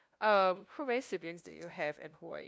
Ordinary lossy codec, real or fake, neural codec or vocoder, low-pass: none; fake; codec, 16 kHz, 0.5 kbps, FunCodec, trained on LibriTTS, 25 frames a second; none